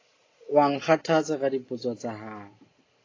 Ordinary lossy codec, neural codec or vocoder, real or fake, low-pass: AAC, 32 kbps; none; real; 7.2 kHz